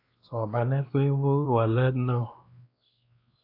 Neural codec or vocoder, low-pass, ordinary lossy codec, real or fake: codec, 16 kHz, 2 kbps, X-Codec, WavLM features, trained on Multilingual LibriSpeech; 5.4 kHz; AAC, 48 kbps; fake